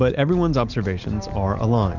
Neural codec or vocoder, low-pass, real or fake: vocoder, 44.1 kHz, 128 mel bands every 256 samples, BigVGAN v2; 7.2 kHz; fake